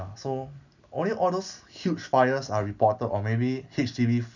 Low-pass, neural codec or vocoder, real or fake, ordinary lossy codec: 7.2 kHz; none; real; none